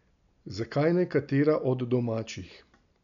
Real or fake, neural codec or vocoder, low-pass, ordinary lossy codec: real; none; 7.2 kHz; none